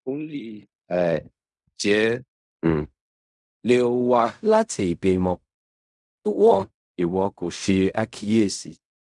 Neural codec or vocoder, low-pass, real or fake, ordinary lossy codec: codec, 16 kHz in and 24 kHz out, 0.4 kbps, LongCat-Audio-Codec, fine tuned four codebook decoder; 10.8 kHz; fake; none